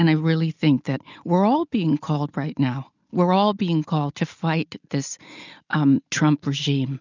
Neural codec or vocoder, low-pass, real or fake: none; 7.2 kHz; real